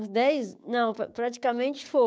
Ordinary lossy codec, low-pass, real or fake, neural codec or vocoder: none; none; fake; codec, 16 kHz, 6 kbps, DAC